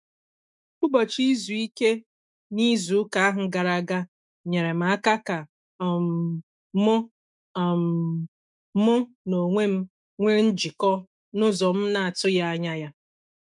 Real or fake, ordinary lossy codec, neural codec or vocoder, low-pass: fake; none; autoencoder, 48 kHz, 128 numbers a frame, DAC-VAE, trained on Japanese speech; 10.8 kHz